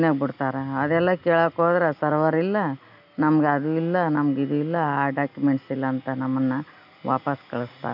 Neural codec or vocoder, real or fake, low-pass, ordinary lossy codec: none; real; 5.4 kHz; AAC, 48 kbps